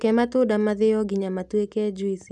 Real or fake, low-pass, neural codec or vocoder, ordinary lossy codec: real; none; none; none